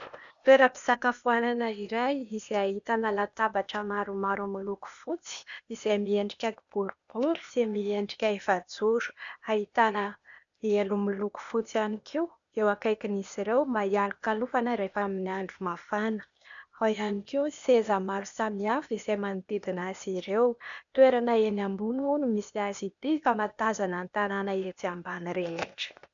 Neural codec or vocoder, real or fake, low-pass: codec, 16 kHz, 0.8 kbps, ZipCodec; fake; 7.2 kHz